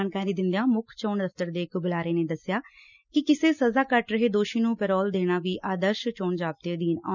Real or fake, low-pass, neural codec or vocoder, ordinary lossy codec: real; 7.2 kHz; none; none